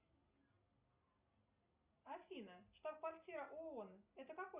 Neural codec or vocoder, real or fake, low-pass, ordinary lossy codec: none; real; 3.6 kHz; Opus, 64 kbps